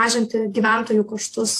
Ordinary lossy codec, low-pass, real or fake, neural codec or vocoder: AAC, 64 kbps; 14.4 kHz; fake; vocoder, 48 kHz, 128 mel bands, Vocos